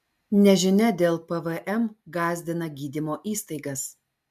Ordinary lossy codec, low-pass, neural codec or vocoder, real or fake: MP3, 96 kbps; 14.4 kHz; none; real